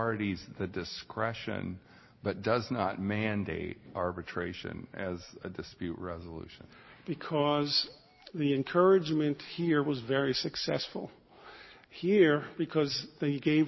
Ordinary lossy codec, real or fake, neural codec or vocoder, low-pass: MP3, 24 kbps; real; none; 7.2 kHz